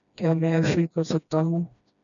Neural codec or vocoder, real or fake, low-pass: codec, 16 kHz, 1 kbps, FreqCodec, smaller model; fake; 7.2 kHz